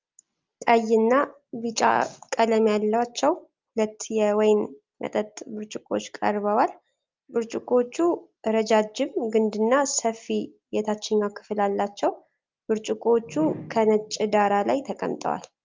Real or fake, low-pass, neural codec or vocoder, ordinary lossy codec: real; 7.2 kHz; none; Opus, 24 kbps